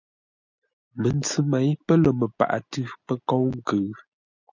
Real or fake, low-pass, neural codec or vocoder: real; 7.2 kHz; none